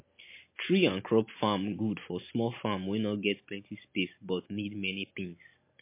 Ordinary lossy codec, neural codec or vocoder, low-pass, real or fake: MP3, 24 kbps; none; 3.6 kHz; real